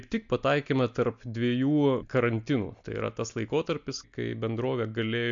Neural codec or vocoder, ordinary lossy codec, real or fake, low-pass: none; MP3, 64 kbps; real; 7.2 kHz